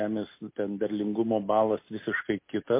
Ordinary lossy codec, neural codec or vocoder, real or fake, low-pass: MP3, 24 kbps; none; real; 3.6 kHz